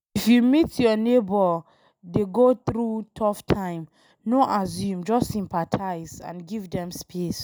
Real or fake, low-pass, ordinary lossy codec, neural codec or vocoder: real; none; none; none